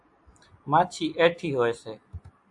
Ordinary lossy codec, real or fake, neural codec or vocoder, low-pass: AAC, 64 kbps; real; none; 10.8 kHz